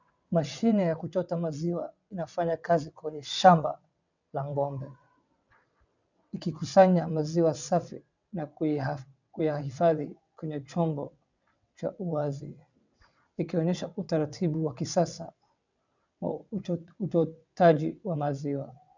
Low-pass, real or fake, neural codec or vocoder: 7.2 kHz; fake; vocoder, 22.05 kHz, 80 mel bands, WaveNeXt